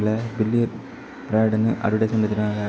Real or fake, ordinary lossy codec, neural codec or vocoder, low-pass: real; none; none; none